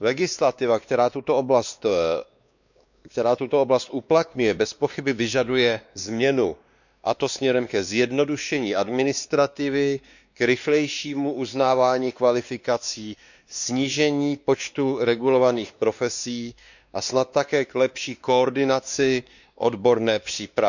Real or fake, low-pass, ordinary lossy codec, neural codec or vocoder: fake; 7.2 kHz; none; codec, 16 kHz, 2 kbps, X-Codec, WavLM features, trained on Multilingual LibriSpeech